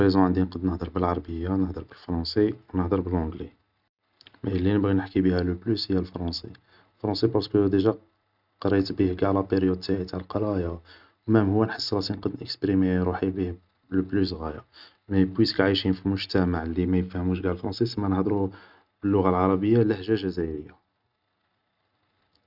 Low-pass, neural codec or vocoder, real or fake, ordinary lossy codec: 5.4 kHz; none; real; none